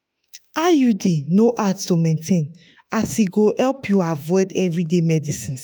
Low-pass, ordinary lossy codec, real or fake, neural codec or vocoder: none; none; fake; autoencoder, 48 kHz, 32 numbers a frame, DAC-VAE, trained on Japanese speech